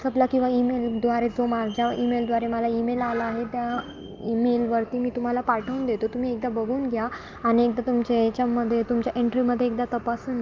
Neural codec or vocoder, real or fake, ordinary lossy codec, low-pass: none; real; Opus, 24 kbps; 7.2 kHz